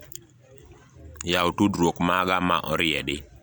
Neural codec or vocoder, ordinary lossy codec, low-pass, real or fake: none; none; none; real